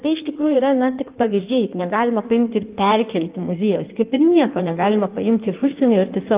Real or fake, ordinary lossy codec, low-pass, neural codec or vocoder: fake; Opus, 32 kbps; 3.6 kHz; codec, 16 kHz in and 24 kHz out, 1.1 kbps, FireRedTTS-2 codec